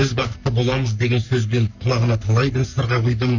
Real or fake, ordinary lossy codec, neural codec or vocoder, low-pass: fake; none; codec, 44.1 kHz, 3.4 kbps, Pupu-Codec; 7.2 kHz